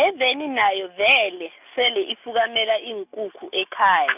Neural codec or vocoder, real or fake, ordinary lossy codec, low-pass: none; real; none; 3.6 kHz